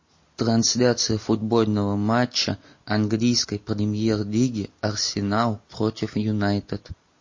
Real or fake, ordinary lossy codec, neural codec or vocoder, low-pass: real; MP3, 32 kbps; none; 7.2 kHz